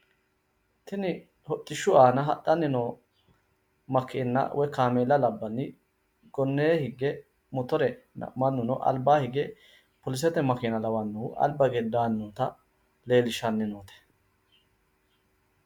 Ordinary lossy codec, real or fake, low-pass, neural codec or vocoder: MP3, 96 kbps; real; 19.8 kHz; none